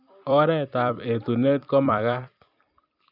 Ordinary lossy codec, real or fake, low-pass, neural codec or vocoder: none; fake; 5.4 kHz; vocoder, 44.1 kHz, 128 mel bands every 256 samples, BigVGAN v2